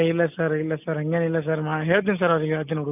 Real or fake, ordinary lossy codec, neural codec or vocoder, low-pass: real; none; none; 3.6 kHz